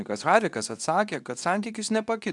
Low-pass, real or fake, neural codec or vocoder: 10.8 kHz; fake; codec, 24 kHz, 0.9 kbps, WavTokenizer, medium speech release version 2